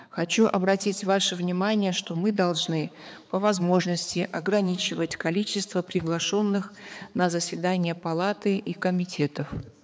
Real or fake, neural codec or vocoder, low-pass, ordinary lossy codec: fake; codec, 16 kHz, 4 kbps, X-Codec, HuBERT features, trained on balanced general audio; none; none